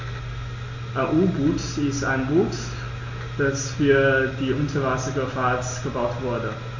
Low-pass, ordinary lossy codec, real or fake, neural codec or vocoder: 7.2 kHz; AAC, 48 kbps; real; none